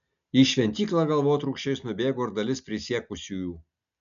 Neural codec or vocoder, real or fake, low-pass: none; real; 7.2 kHz